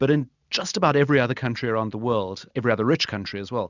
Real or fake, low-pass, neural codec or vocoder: real; 7.2 kHz; none